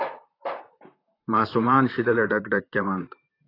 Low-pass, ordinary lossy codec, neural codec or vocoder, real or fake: 5.4 kHz; AAC, 24 kbps; vocoder, 44.1 kHz, 128 mel bands, Pupu-Vocoder; fake